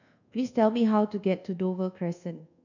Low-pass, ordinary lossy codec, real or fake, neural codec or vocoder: 7.2 kHz; none; fake; codec, 24 kHz, 1.2 kbps, DualCodec